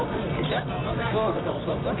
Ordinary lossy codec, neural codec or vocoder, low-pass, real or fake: AAC, 16 kbps; codec, 24 kHz, 0.9 kbps, WavTokenizer, medium music audio release; 7.2 kHz; fake